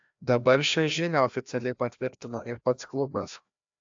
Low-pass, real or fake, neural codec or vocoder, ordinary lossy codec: 7.2 kHz; fake; codec, 16 kHz, 1 kbps, FreqCodec, larger model; AAC, 64 kbps